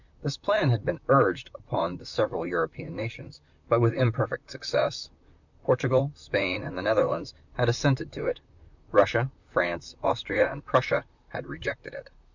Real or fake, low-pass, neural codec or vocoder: fake; 7.2 kHz; vocoder, 44.1 kHz, 128 mel bands, Pupu-Vocoder